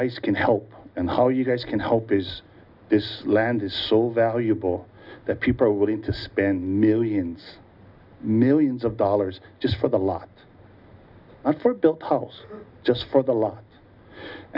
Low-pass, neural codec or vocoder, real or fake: 5.4 kHz; none; real